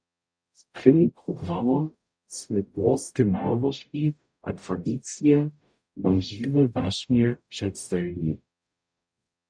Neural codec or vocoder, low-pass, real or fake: codec, 44.1 kHz, 0.9 kbps, DAC; 9.9 kHz; fake